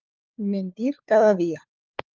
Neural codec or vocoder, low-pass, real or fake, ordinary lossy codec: codec, 16 kHz, 4 kbps, FunCodec, trained on LibriTTS, 50 frames a second; 7.2 kHz; fake; Opus, 24 kbps